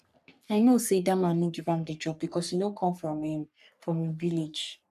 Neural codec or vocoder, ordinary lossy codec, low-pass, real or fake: codec, 44.1 kHz, 3.4 kbps, Pupu-Codec; none; 14.4 kHz; fake